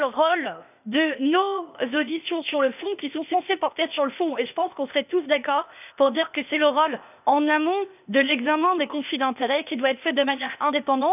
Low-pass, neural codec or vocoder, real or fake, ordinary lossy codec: 3.6 kHz; codec, 16 kHz, 0.8 kbps, ZipCodec; fake; none